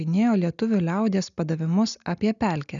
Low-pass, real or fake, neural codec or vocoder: 7.2 kHz; real; none